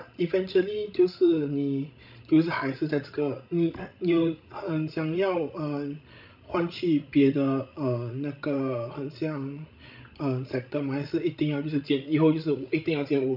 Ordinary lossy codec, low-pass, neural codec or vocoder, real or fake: none; 5.4 kHz; codec, 16 kHz, 16 kbps, FreqCodec, larger model; fake